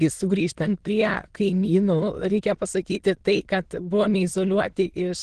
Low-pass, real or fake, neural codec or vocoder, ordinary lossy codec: 9.9 kHz; fake; autoencoder, 22.05 kHz, a latent of 192 numbers a frame, VITS, trained on many speakers; Opus, 16 kbps